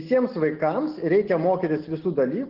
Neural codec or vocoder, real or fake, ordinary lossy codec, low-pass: none; real; Opus, 16 kbps; 5.4 kHz